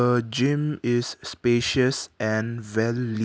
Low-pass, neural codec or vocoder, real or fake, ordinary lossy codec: none; none; real; none